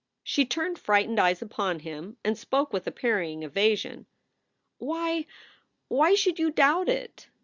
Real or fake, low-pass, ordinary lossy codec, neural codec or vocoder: real; 7.2 kHz; Opus, 64 kbps; none